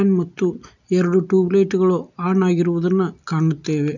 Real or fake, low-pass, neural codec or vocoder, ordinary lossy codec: real; 7.2 kHz; none; none